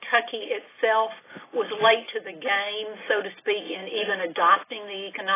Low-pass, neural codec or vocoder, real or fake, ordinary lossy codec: 3.6 kHz; none; real; AAC, 16 kbps